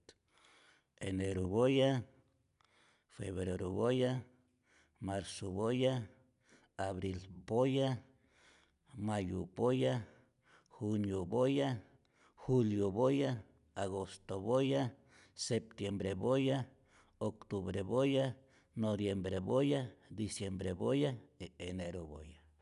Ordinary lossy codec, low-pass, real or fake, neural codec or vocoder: none; 10.8 kHz; real; none